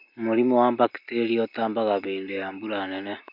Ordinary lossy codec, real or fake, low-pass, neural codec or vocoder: MP3, 48 kbps; real; 5.4 kHz; none